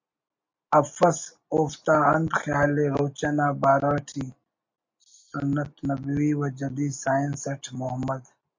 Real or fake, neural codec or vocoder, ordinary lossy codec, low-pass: real; none; MP3, 48 kbps; 7.2 kHz